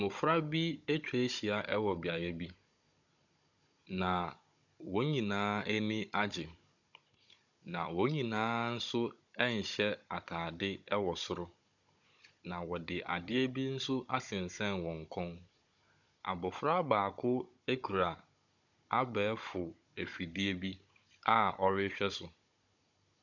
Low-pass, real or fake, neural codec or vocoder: 7.2 kHz; fake; codec, 16 kHz, 16 kbps, FunCodec, trained on Chinese and English, 50 frames a second